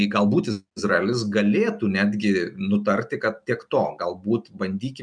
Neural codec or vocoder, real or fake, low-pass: none; real; 9.9 kHz